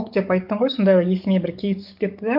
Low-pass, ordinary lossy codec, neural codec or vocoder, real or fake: 5.4 kHz; MP3, 48 kbps; codec, 44.1 kHz, 7.8 kbps, DAC; fake